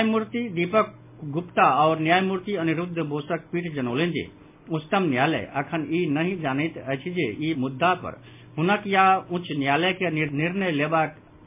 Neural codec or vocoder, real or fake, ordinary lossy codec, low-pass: none; real; MP3, 24 kbps; 3.6 kHz